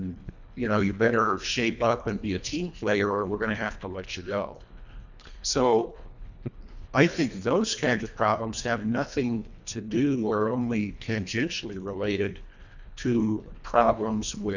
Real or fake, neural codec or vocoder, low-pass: fake; codec, 24 kHz, 1.5 kbps, HILCodec; 7.2 kHz